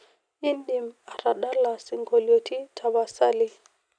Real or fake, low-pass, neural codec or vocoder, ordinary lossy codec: real; 9.9 kHz; none; none